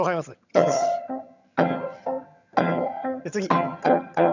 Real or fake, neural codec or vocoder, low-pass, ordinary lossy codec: fake; vocoder, 22.05 kHz, 80 mel bands, HiFi-GAN; 7.2 kHz; none